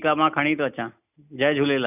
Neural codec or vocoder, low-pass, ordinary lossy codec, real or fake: none; 3.6 kHz; none; real